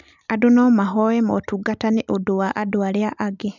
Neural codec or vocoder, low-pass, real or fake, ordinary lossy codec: none; 7.2 kHz; real; none